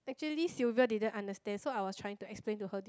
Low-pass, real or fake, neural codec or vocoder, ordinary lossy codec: none; real; none; none